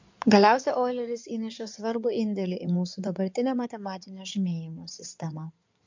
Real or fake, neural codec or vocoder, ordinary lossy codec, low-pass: fake; codec, 16 kHz in and 24 kHz out, 2.2 kbps, FireRedTTS-2 codec; MP3, 64 kbps; 7.2 kHz